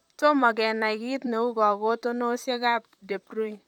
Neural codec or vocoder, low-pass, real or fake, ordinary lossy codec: vocoder, 44.1 kHz, 128 mel bands, Pupu-Vocoder; 19.8 kHz; fake; none